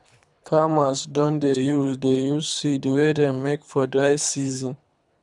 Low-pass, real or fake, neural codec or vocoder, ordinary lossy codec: 10.8 kHz; fake; codec, 24 kHz, 3 kbps, HILCodec; none